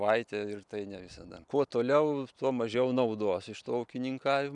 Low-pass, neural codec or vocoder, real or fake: 10.8 kHz; vocoder, 44.1 kHz, 128 mel bands every 256 samples, BigVGAN v2; fake